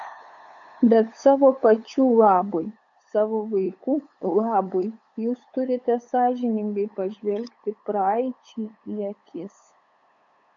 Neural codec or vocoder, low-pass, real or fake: codec, 16 kHz, 8 kbps, FunCodec, trained on LibriTTS, 25 frames a second; 7.2 kHz; fake